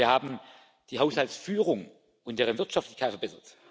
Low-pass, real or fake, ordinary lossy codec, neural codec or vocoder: none; real; none; none